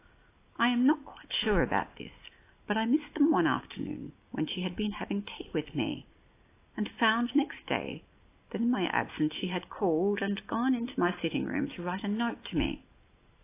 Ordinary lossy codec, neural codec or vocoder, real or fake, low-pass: AAC, 24 kbps; none; real; 3.6 kHz